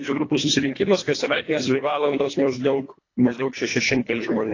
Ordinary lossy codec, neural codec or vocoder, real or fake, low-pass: AAC, 32 kbps; codec, 24 kHz, 1.5 kbps, HILCodec; fake; 7.2 kHz